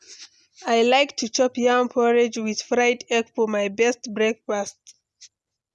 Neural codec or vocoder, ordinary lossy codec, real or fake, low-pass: none; none; real; none